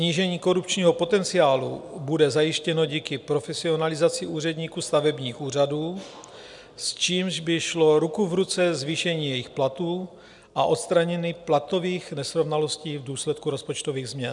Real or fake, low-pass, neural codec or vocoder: real; 10.8 kHz; none